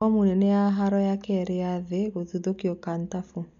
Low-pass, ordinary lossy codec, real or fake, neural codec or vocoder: 7.2 kHz; none; real; none